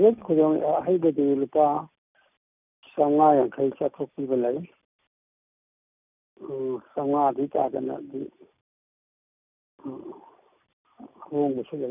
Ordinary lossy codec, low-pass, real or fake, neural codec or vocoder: none; 3.6 kHz; real; none